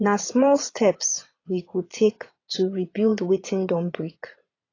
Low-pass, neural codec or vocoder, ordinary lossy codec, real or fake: 7.2 kHz; vocoder, 22.05 kHz, 80 mel bands, Vocos; AAC, 32 kbps; fake